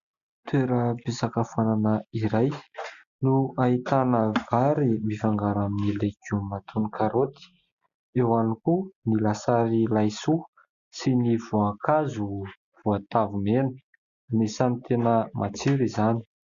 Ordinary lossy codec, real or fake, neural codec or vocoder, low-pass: Opus, 64 kbps; real; none; 7.2 kHz